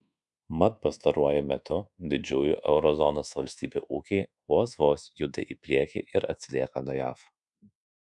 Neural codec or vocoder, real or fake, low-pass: codec, 24 kHz, 1.2 kbps, DualCodec; fake; 10.8 kHz